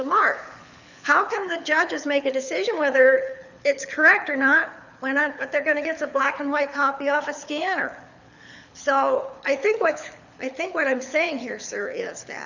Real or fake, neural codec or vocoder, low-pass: fake; codec, 24 kHz, 6 kbps, HILCodec; 7.2 kHz